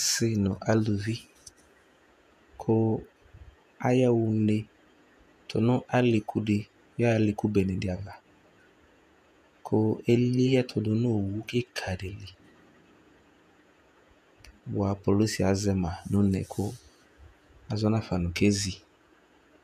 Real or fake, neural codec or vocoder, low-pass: fake; vocoder, 48 kHz, 128 mel bands, Vocos; 14.4 kHz